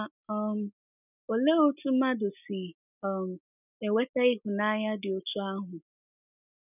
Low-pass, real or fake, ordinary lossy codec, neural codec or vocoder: 3.6 kHz; real; none; none